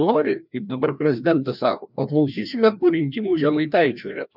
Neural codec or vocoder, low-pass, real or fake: codec, 16 kHz, 1 kbps, FreqCodec, larger model; 5.4 kHz; fake